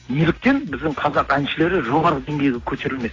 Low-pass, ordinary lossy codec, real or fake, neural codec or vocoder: 7.2 kHz; AAC, 32 kbps; real; none